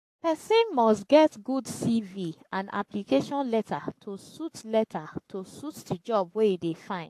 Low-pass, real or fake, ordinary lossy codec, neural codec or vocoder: 14.4 kHz; fake; AAC, 64 kbps; codec, 44.1 kHz, 7.8 kbps, Pupu-Codec